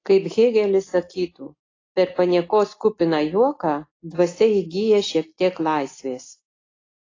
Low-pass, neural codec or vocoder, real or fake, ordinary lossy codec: 7.2 kHz; none; real; AAC, 32 kbps